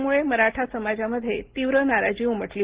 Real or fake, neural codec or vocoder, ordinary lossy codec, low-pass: real; none; Opus, 16 kbps; 3.6 kHz